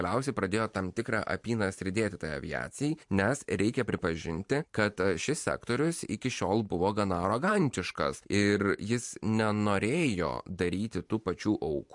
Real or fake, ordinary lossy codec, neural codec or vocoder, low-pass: fake; MP3, 64 kbps; vocoder, 44.1 kHz, 128 mel bands every 512 samples, BigVGAN v2; 10.8 kHz